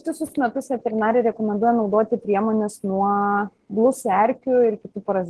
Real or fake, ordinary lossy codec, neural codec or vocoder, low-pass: real; Opus, 16 kbps; none; 10.8 kHz